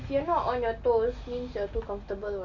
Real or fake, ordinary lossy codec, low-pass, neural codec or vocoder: real; none; 7.2 kHz; none